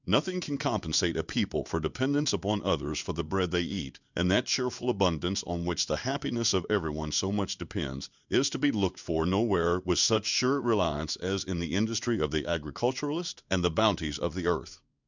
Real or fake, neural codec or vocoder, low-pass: fake; codec, 16 kHz in and 24 kHz out, 1 kbps, XY-Tokenizer; 7.2 kHz